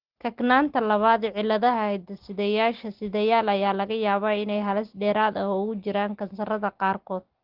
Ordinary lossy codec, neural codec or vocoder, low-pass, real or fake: Opus, 16 kbps; none; 5.4 kHz; real